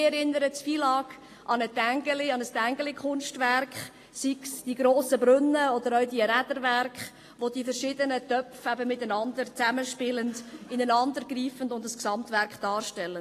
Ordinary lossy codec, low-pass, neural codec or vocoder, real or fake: AAC, 48 kbps; 14.4 kHz; none; real